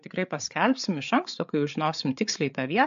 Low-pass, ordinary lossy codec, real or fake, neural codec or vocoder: 7.2 kHz; MP3, 64 kbps; fake; codec, 16 kHz, 8 kbps, FreqCodec, larger model